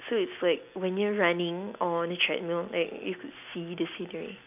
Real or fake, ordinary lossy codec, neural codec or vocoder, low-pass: real; none; none; 3.6 kHz